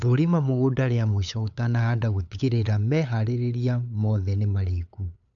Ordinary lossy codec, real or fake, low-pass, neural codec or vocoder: none; fake; 7.2 kHz; codec, 16 kHz, 8 kbps, FunCodec, trained on LibriTTS, 25 frames a second